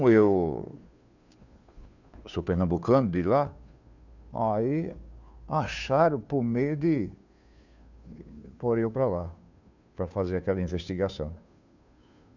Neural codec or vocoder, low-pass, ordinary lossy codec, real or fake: codec, 16 kHz, 2 kbps, FunCodec, trained on Chinese and English, 25 frames a second; 7.2 kHz; none; fake